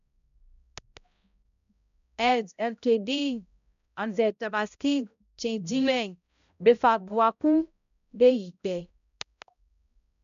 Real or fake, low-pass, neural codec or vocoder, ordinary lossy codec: fake; 7.2 kHz; codec, 16 kHz, 0.5 kbps, X-Codec, HuBERT features, trained on balanced general audio; none